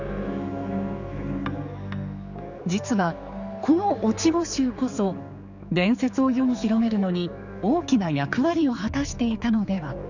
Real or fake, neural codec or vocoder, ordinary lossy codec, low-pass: fake; codec, 16 kHz, 4 kbps, X-Codec, HuBERT features, trained on general audio; none; 7.2 kHz